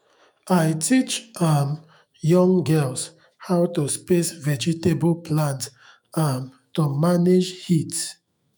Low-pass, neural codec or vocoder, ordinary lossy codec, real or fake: none; autoencoder, 48 kHz, 128 numbers a frame, DAC-VAE, trained on Japanese speech; none; fake